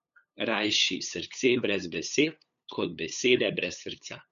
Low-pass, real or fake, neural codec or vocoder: 7.2 kHz; fake; codec, 16 kHz, 8 kbps, FunCodec, trained on LibriTTS, 25 frames a second